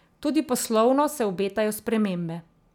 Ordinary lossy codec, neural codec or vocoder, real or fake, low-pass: none; none; real; 19.8 kHz